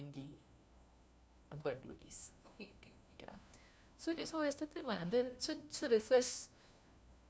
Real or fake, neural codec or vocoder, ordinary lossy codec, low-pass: fake; codec, 16 kHz, 1 kbps, FunCodec, trained on LibriTTS, 50 frames a second; none; none